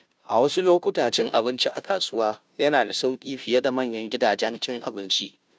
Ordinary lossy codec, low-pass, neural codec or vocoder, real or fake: none; none; codec, 16 kHz, 0.5 kbps, FunCodec, trained on Chinese and English, 25 frames a second; fake